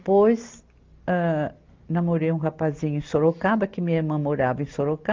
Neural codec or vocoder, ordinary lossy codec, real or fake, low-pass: none; Opus, 24 kbps; real; 7.2 kHz